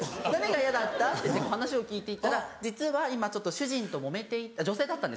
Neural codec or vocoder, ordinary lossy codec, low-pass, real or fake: none; none; none; real